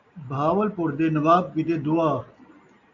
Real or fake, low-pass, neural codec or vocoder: real; 7.2 kHz; none